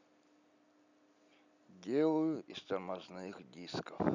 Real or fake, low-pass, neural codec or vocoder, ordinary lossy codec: real; 7.2 kHz; none; none